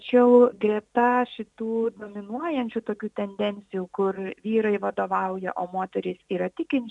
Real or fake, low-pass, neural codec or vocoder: real; 10.8 kHz; none